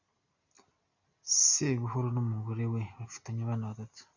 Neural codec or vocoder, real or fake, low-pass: none; real; 7.2 kHz